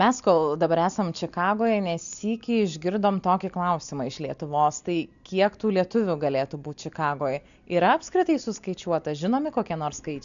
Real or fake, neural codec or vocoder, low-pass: real; none; 7.2 kHz